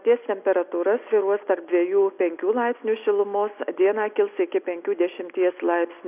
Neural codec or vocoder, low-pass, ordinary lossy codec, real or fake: none; 3.6 kHz; AAC, 32 kbps; real